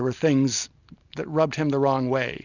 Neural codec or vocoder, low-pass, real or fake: none; 7.2 kHz; real